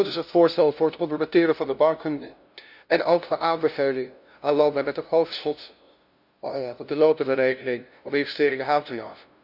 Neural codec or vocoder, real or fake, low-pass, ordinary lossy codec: codec, 16 kHz, 0.5 kbps, FunCodec, trained on LibriTTS, 25 frames a second; fake; 5.4 kHz; none